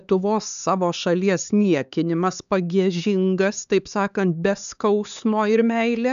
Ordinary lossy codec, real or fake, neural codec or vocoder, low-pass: MP3, 96 kbps; fake; codec, 16 kHz, 4 kbps, X-Codec, HuBERT features, trained on LibriSpeech; 7.2 kHz